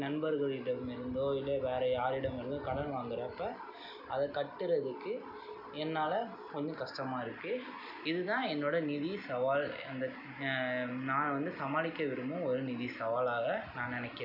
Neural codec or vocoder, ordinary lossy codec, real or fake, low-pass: none; none; real; 5.4 kHz